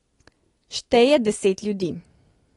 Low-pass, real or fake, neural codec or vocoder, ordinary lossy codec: 10.8 kHz; real; none; AAC, 32 kbps